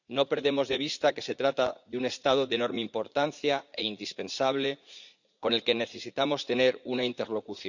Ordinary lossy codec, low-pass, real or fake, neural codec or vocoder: none; 7.2 kHz; fake; vocoder, 44.1 kHz, 80 mel bands, Vocos